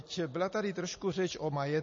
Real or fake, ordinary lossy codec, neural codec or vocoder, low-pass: real; MP3, 32 kbps; none; 7.2 kHz